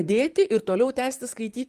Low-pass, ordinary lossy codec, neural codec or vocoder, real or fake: 14.4 kHz; Opus, 32 kbps; vocoder, 44.1 kHz, 128 mel bands, Pupu-Vocoder; fake